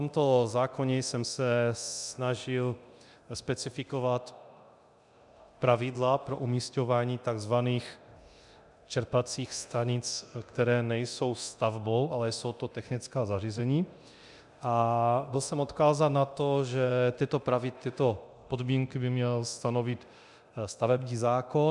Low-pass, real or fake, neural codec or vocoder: 10.8 kHz; fake; codec, 24 kHz, 0.9 kbps, DualCodec